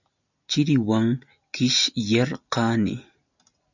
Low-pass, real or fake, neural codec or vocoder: 7.2 kHz; real; none